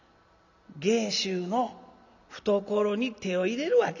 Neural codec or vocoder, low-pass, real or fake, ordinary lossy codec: none; 7.2 kHz; real; none